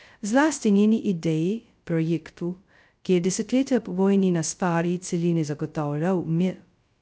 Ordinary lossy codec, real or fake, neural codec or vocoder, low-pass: none; fake; codec, 16 kHz, 0.2 kbps, FocalCodec; none